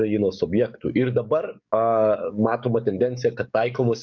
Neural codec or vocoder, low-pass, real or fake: codec, 44.1 kHz, 7.8 kbps, DAC; 7.2 kHz; fake